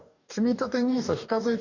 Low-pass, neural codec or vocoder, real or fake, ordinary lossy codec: 7.2 kHz; codec, 44.1 kHz, 2.6 kbps, DAC; fake; none